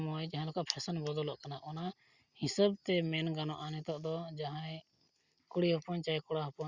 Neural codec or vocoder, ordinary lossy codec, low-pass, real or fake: none; Opus, 64 kbps; 7.2 kHz; real